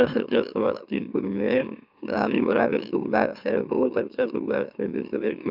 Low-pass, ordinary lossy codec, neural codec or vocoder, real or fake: 5.4 kHz; none; autoencoder, 44.1 kHz, a latent of 192 numbers a frame, MeloTTS; fake